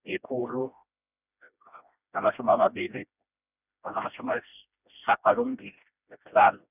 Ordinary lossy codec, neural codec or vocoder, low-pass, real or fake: none; codec, 16 kHz, 1 kbps, FreqCodec, smaller model; 3.6 kHz; fake